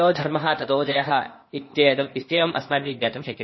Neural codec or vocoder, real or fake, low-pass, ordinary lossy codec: codec, 16 kHz, 0.8 kbps, ZipCodec; fake; 7.2 kHz; MP3, 24 kbps